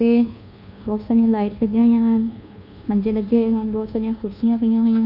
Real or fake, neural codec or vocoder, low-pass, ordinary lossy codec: fake; codec, 24 kHz, 1.2 kbps, DualCodec; 5.4 kHz; none